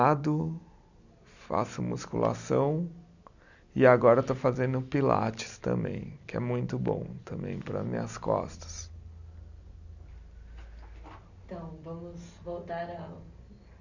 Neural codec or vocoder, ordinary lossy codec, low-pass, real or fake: none; none; 7.2 kHz; real